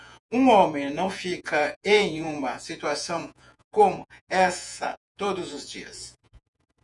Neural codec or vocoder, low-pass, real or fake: vocoder, 48 kHz, 128 mel bands, Vocos; 10.8 kHz; fake